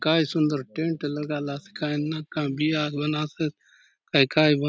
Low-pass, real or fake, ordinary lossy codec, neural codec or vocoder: none; real; none; none